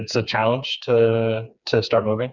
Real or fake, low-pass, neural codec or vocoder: fake; 7.2 kHz; codec, 16 kHz, 4 kbps, FreqCodec, smaller model